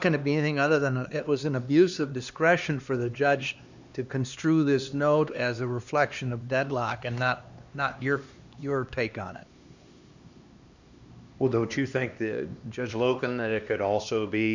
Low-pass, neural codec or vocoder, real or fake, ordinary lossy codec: 7.2 kHz; codec, 16 kHz, 2 kbps, X-Codec, HuBERT features, trained on LibriSpeech; fake; Opus, 64 kbps